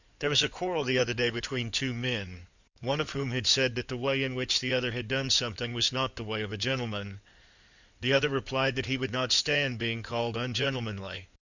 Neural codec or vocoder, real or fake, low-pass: codec, 16 kHz in and 24 kHz out, 2.2 kbps, FireRedTTS-2 codec; fake; 7.2 kHz